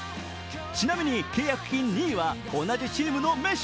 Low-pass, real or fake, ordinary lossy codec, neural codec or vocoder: none; real; none; none